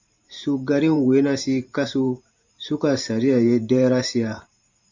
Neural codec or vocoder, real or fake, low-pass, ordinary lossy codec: vocoder, 44.1 kHz, 128 mel bands every 512 samples, BigVGAN v2; fake; 7.2 kHz; MP3, 64 kbps